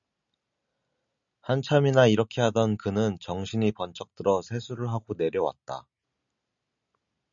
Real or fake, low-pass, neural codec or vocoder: real; 7.2 kHz; none